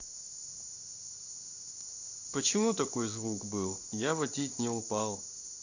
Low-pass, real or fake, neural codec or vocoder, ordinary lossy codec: 7.2 kHz; fake; codec, 16 kHz in and 24 kHz out, 1 kbps, XY-Tokenizer; Opus, 64 kbps